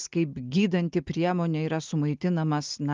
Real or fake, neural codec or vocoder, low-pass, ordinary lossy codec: real; none; 7.2 kHz; Opus, 32 kbps